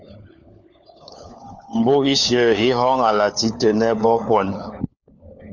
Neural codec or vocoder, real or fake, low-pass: codec, 16 kHz, 4 kbps, FunCodec, trained on LibriTTS, 50 frames a second; fake; 7.2 kHz